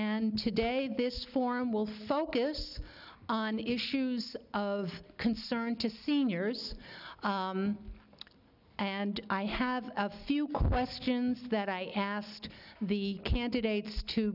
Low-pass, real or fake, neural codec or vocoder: 5.4 kHz; real; none